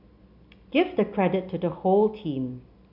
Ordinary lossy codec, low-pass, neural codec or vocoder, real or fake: none; 5.4 kHz; none; real